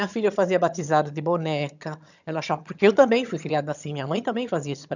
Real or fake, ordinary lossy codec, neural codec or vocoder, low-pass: fake; none; vocoder, 22.05 kHz, 80 mel bands, HiFi-GAN; 7.2 kHz